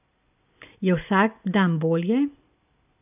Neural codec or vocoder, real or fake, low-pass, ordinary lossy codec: none; real; 3.6 kHz; none